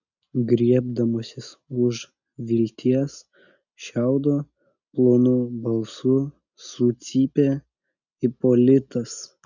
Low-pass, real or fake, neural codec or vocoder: 7.2 kHz; real; none